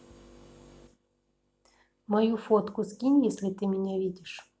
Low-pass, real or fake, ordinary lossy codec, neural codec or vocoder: none; real; none; none